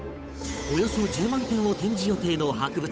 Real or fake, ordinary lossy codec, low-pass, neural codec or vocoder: fake; none; none; codec, 16 kHz, 8 kbps, FunCodec, trained on Chinese and English, 25 frames a second